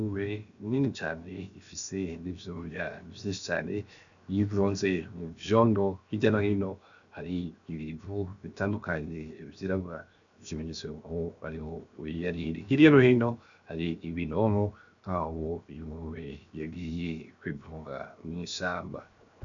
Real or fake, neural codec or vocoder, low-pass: fake; codec, 16 kHz, 0.7 kbps, FocalCodec; 7.2 kHz